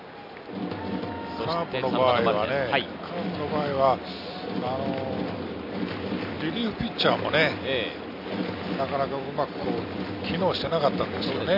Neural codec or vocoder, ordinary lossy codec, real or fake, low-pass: none; none; real; 5.4 kHz